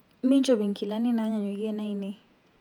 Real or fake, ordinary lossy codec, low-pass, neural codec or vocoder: fake; none; 19.8 kHz; vocoder, 48 kHz, 128 mel bands, Vocos